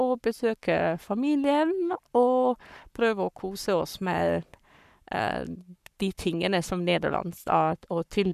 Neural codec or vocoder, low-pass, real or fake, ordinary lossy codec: codec, 44.1 kHz, 7.8 kbps, Pupu-Codec; 14.4 kHz; fake; none